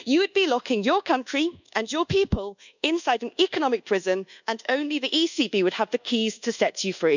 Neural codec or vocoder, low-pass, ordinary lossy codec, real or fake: codec, 24 kHz, 1.2 kbps, DualCodec; 7.2 kHz; none; fake